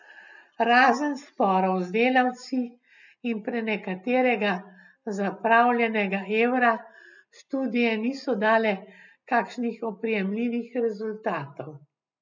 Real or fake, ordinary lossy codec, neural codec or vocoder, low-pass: real; none; none; 7.2 kHz